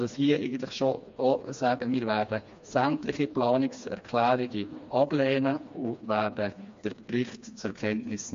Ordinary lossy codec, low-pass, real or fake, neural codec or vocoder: MP3, 48 kbps; 7.2 kHz; fake; codec, 16 kHz, 2 kbps, FreqCodec, smaller model